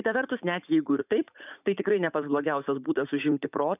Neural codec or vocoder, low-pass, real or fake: vocoder, 44.1 kHz, 80 mel bands, Vocos; 3.6 kHz; fake